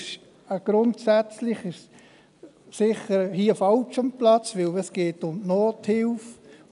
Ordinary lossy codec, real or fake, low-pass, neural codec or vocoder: none; real; 10.8 kHz; none